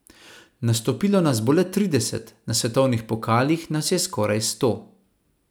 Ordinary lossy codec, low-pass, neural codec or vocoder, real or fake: none; none; none; real